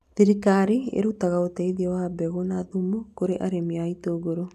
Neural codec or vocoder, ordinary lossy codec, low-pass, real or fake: none; none; 14.4 kHz; real